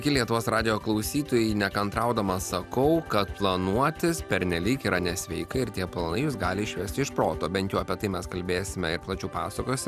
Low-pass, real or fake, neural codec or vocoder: 14.4 kHz; real; none